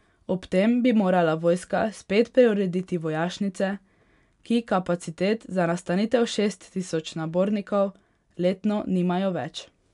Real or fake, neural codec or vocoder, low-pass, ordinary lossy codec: real; none; 10.8 kHz; none